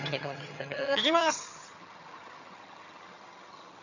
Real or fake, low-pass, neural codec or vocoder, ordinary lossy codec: fake; 7.2 kHz; vocoder, 22.05 kHz, 80 mel bands, HiFi-GAN; none